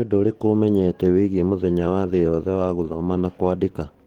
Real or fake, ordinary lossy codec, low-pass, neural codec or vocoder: fake; Opus, 16 kbps; 19.8 kHz; codec, 44.1 kHz, 7.8 kbps, DAC